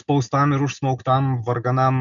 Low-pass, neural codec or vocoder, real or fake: 7.2 kHz; none; real